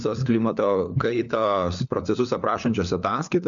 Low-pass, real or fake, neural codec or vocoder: 7.2 kHz; fake; codec, 16 kHz, 4 kbps, FunCodec, trained on LibriTTS, 50 frames a second